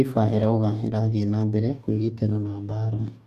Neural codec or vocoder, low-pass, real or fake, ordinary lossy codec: codec, 44.1 kHz, 2.6 kbps, DAC; 14.4 kHz; fake; none